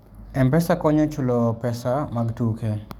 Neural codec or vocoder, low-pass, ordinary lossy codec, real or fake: codec, 44.1 kHz, 7.8 kbps, DAC; 19.8 kHz; none; fake